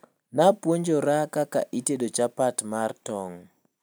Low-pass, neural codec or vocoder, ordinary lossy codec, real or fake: none; none; none; real